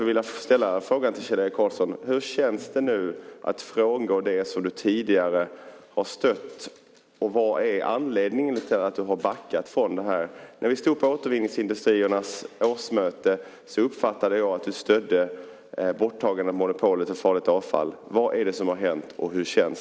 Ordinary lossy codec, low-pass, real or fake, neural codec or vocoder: none; none; real; none